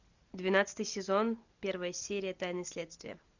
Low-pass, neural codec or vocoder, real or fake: 7.2 kHz; none; real